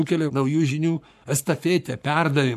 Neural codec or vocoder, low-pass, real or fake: codec, 44.1 kHz, 7.8 kbps, Pupu-Codec; 14.4 kHz; fake